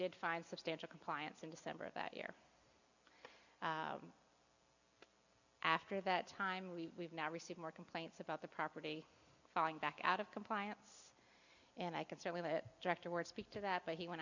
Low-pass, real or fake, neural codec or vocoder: 7.2 kHz; real; none